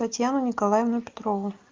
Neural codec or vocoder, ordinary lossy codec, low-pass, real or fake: none; Opus, 32 kbps; 7.2 kHz; real